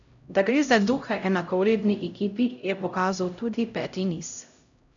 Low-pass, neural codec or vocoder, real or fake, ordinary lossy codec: 7.2 kHz; codec, 16 kHz, 0.5 kbps, X-Codec, HuBERT features, trained on LibriSpeech; fake; none